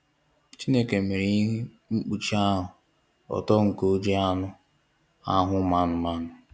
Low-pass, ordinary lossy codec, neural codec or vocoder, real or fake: none; none; none; real